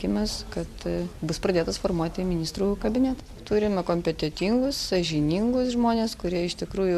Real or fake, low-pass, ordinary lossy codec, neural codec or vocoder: real; 14.4 kHz; AAC, 96 kbps; none